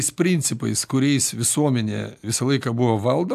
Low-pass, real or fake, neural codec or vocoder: 14.4 kHz; real; none